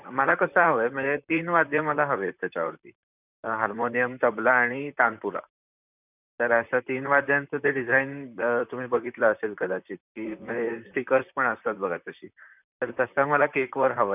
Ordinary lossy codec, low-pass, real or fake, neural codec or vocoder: none; 3.6 kHz; fake; vocoder, 44.1 kHz, 128 mel bands, Pupu-Vocoder